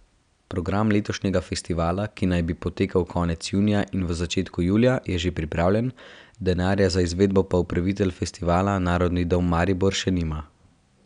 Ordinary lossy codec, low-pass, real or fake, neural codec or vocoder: none; 9.9 kHz; real; none